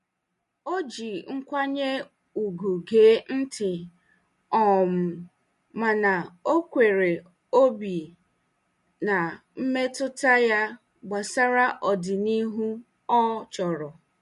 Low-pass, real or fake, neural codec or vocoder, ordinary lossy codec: 14.4 kHz; real; none; MP3, 48 kbps